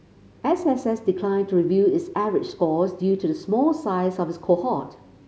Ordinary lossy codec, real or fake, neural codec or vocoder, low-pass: none; real; none; none